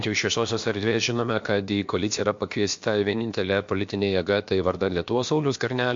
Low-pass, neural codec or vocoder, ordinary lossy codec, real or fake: 7.2 kHz; codec, 16 kHz, about 1 kbps, DyCAST, with the encoder's durations; MP3, 48 kbps; fake